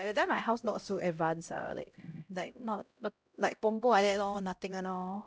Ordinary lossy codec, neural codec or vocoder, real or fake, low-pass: none; codec, 16 kHz, 0.5 kbps, X-Codec, HuBERT features, trained on LibriSpeech; fake; none